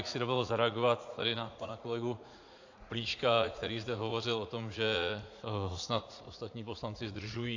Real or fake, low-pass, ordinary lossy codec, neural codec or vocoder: fake; 7.2 kHz; AAC, 48 kbps; vocoder, 44.1 kHz, 80 mel bands, Vocos